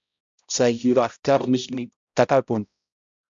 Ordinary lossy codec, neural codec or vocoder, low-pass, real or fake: MP3, 64 kbps; codec, 16 kHz, 0.5 kbps, X-Codec, HuBERT features, trained on balanced general audio; 7.2 kHz; fake